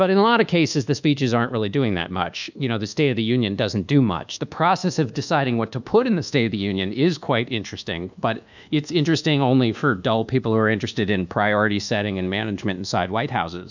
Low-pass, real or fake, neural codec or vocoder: 7.2 kHz; fake; codec, 24 kHz, 1.2 kbps, DualCodec